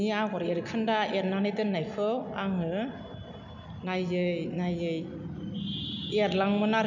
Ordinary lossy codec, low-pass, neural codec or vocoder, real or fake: none; 7.2 kHz; none; real